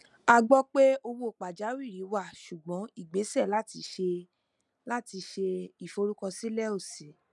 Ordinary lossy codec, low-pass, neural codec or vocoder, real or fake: none; 10.8 kHz; none; real